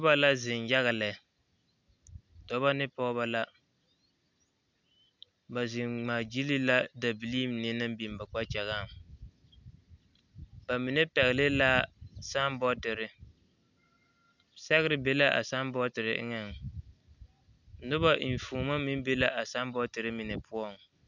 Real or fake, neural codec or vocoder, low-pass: real; none; 7.2 kHz